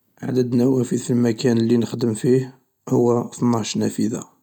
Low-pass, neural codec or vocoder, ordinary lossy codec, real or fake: 19.8 kHz; none; none; real